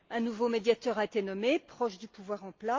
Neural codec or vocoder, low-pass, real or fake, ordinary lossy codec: none; 7.2 kHz; real; Opus, 32 kbps